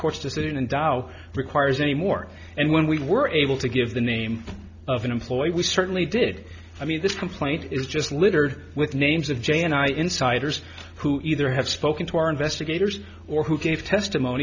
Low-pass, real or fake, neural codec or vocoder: 7.2 kHz; real; none